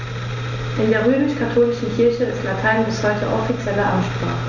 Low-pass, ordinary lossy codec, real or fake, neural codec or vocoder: 7.2 kHz; Opus, 64 kbps; real; none